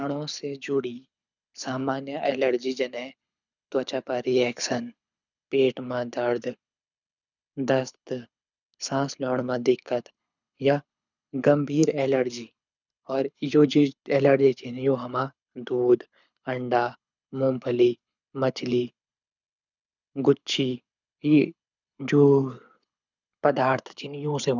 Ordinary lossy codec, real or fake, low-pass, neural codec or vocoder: none; fake; 7.2 kHz; codec, 24 kHz, 6 kbps, HILCodec